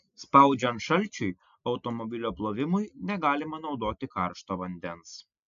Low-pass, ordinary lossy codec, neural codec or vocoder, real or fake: 7.2 kHz; AAC, 96 kbps; none; real